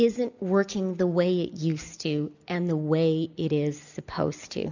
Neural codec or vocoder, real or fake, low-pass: none; real; 7.2 kHz